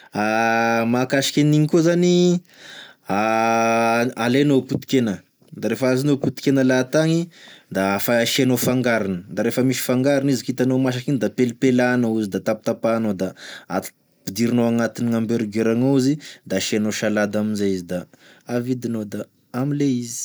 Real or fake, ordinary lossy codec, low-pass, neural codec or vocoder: real; none; none; none